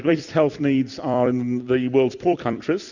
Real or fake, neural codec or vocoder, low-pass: fake; vocoder, 44.1 kHz, 128 mel bands every 256 samples, BigVGAN v2; 7.2 kHz